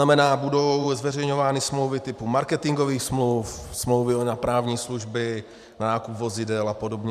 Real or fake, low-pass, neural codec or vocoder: fake; 14.4 kHz; vocoder, 44.1 kHz, 128 mel bands every 512 samples, BigVGAN v2